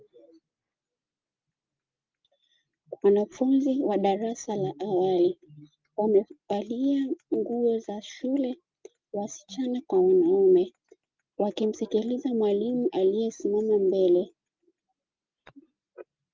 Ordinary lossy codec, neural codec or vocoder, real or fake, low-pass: Opus, 32 kbps; none; real; 7.2 kHz